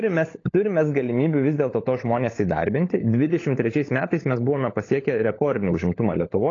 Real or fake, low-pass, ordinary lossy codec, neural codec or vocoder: fake; 7.2 kHz; AAC, 32 kbps; codec, 16 kHz, 16 kbps, FunCodec, trained on LibriTTS, 50 frames a second